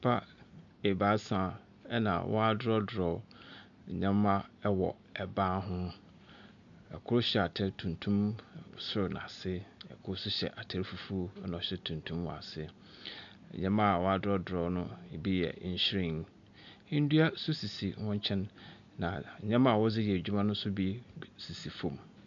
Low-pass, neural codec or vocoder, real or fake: 7.2 kHz; none; real